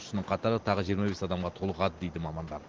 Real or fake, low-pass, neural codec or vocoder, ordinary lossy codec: real; 7.2 kHz; none; Opus, 16 kbps